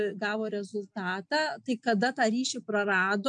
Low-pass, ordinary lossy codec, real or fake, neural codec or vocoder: 9.9 kHz; MP3, 64 kbps; real; none